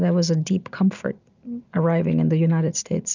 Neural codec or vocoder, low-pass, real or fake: none; 7.2 kHz; real